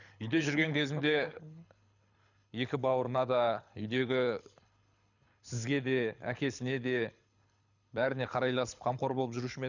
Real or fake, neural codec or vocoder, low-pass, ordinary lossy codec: fake; codec, 24 kHz, 6 kbps, HILCodec; 7.2 kHz; none